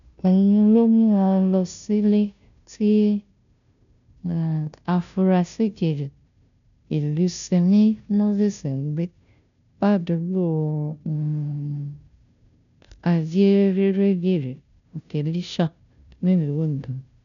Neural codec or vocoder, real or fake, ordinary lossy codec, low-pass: codec, 16 kHz, 0.5 kbps, FunCodec, trained on Chinese and English, 25 frames a second; fake; none; 7.2 kHz